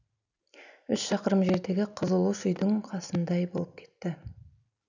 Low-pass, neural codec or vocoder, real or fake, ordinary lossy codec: 7.2 kHz; none; real; none